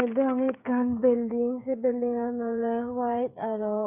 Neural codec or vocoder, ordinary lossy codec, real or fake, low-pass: codec, 16 kHz, 8 kbps, FreqCodec, smaller model; none; fake; 3.6 kHz